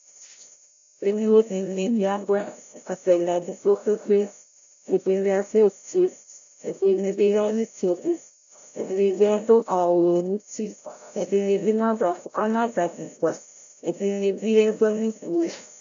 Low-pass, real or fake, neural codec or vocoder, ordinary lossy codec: 7.2 kHz; fake; codec, 16 kHz, 0.5 kbps, FreqCodec, larger model; AAC, 64 kbps